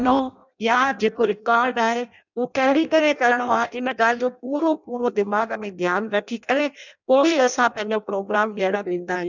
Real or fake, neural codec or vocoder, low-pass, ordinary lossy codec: fake; codec, 16 kHz in and 24 kHz out, 0.6 kbps, FireRedTTS-2 codec; 7.2 kHz; none